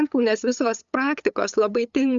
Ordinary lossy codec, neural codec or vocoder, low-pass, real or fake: Opus, 64 kbps; codec, 16 kHz, 8 kbps, FunCodec, trained on LibriTTS, 25 frames a second; 7.2 kHz; fake